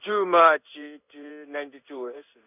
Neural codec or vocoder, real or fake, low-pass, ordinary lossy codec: codec, 16 kHz in and 24 kHz out, 1 kbps, XY-Tokenizer; fake; 3.6 kHz; none